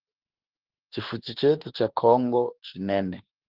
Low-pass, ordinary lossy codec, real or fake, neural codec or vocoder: 5.4 kHz; Opus, 16 kbps; fake; autoencoder, 48 kHz, 32 numbers a frame, DAC-VAE, trained on Japanese speech